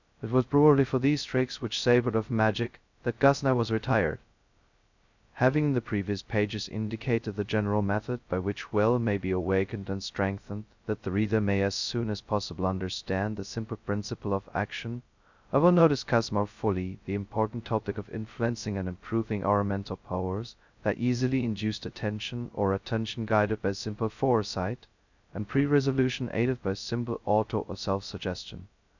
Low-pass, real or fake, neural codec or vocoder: 7.2 kHz; fake; codec, 16 kHz, 0.2 kbps, FocalCodec